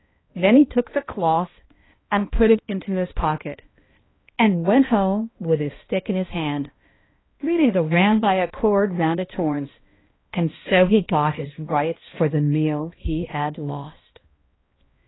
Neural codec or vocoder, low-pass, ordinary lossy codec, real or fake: codec, 16 kHz, 1 kbps, X-Codec, HuBERT features, trained on balanced general audio; 7.2 kHz; AAC, 16 kbps; fake